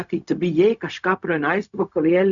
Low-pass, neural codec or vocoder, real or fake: 7.2 kHz; codec, 16 kHz, 0.4 kbps, LongCat-Audio-Codec; fake